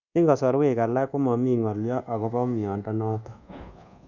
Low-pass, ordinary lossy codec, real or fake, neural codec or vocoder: 7.2 kHz; none; fake; codec, 24 kHz, 1.2 kbps, DualCodec